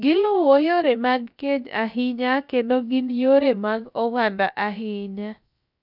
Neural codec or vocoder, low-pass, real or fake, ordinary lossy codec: codec, 16 kHz, about 1 kbps, DyCAST, with the encoder's durations; 5.4 kHz; fake; none